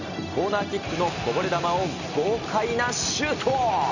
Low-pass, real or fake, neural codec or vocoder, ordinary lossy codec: 7.2 kHz; real; none; none